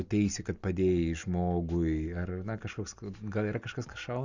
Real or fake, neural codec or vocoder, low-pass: real; none; 7.2 kHz